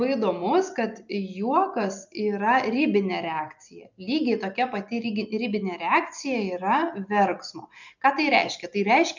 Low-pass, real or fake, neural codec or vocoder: 7.2 kHz; real; none